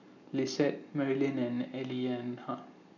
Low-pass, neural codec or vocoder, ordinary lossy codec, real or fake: 7.2 kHz; none; none; real